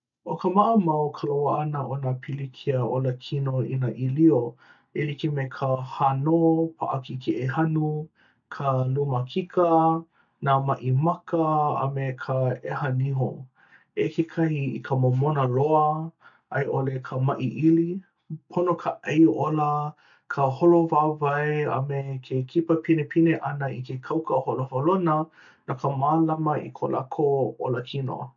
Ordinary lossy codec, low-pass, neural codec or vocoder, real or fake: MP3, 96 kbps; 7.2 kHz; none; real